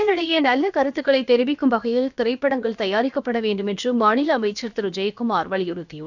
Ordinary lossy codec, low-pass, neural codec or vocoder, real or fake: none; 7.2 kHz; codec, 16 kHz, about 1 kbps, DyCAST, with the encoder's durations; fake